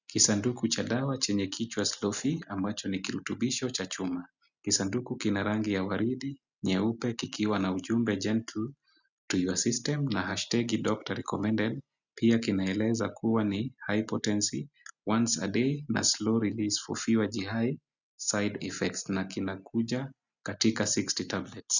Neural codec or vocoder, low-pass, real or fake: none; 7.2 kHz; real